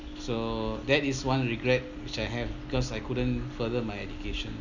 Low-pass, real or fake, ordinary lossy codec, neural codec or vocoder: 7.2 kHz; real; none; none